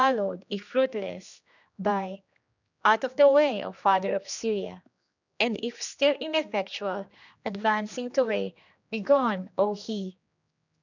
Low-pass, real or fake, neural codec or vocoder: 7.2 kHz; fake; codec, 16 kHz, 2 kbps, X-Codec, HuBERT features, trained on general audio